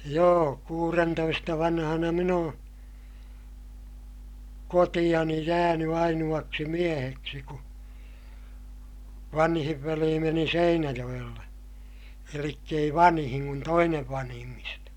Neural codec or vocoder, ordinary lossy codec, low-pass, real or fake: none; none; 19.8 kHz; real